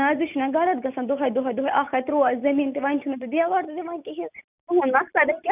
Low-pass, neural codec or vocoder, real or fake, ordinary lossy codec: 3.6 kHz; none; real; none